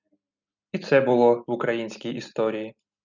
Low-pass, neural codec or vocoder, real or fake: 7.2 kHz; none; real